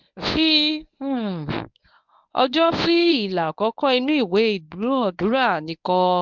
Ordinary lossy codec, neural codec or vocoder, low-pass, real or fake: MP3, 64 kbps; codec, 24 kHz, 0.9 kbps, WavTokenizer, medium speech release version 1; 7.2 kHz; fake